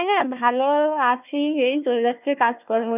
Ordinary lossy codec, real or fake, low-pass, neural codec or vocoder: none; fake; 3.6 kHz; codec, 16 kHz, 1 kbps, FunCodec, trained on Chinese and English, 50 frames a second